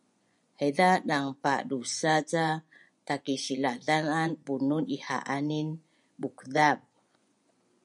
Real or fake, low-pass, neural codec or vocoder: fake; 10.8 kHz; vocoder, 24 kHz, 100 mel bands, Vocos